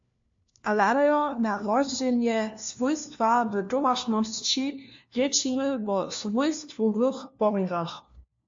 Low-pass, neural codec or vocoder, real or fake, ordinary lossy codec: 7.2 kHz; codec, 16 kHz, 1 kbps, FunCodec, trained on LibriTTS, 50 frames a second; fake; MP3, 48 kbps